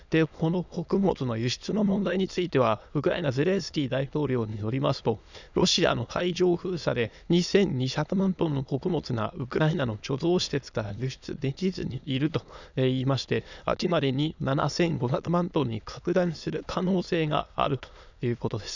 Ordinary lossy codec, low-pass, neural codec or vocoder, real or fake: none; 7.2 kHz; autoencoder, 22.05 kHz, a latent of 192 numbers a frame, VITS, trained on many speakers; fake